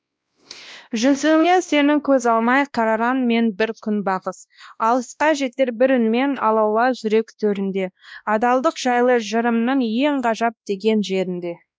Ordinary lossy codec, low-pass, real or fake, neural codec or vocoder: none; none; fake; codec, 16 kHz, 1 kbps, X-Codec, WavLM features, trained on Multilingual LibriSpeech